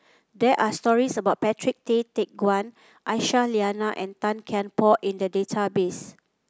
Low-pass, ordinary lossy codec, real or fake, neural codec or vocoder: none; none; real; none